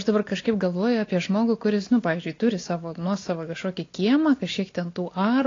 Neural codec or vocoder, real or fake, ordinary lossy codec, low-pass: none; real; AAC, 32 kbps; 7.2 kHz